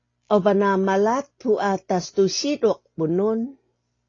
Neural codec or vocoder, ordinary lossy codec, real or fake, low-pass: none; AAC, 32 kbps; real; 7.2 kHz